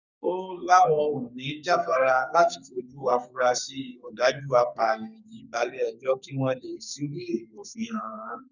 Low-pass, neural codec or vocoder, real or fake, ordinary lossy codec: 7.2 kHz; codec, 32 kHz, 1.9 kbps, SNAC; fake; none